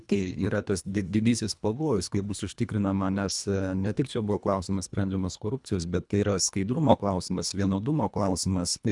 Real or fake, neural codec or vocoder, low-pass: fake; codec, 24 kHz, 1.5 kbps, HILCodec; 10.8 kHz